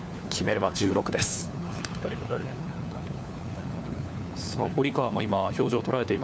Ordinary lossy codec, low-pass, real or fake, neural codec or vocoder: none; none; fake; codec, 16 kHz, 4 kbps, FunCodec, trained on LibriTTS, 50 frames a second